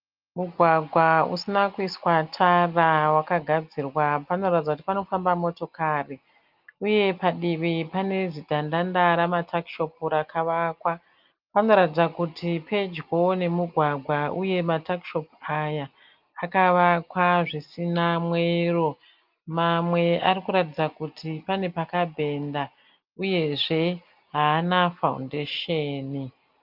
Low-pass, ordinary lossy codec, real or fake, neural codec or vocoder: 5.4 kHz; Opus, 24 kbps; real; none